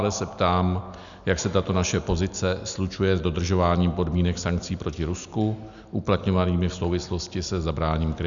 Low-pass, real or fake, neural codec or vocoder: 7.2 kHz; real; none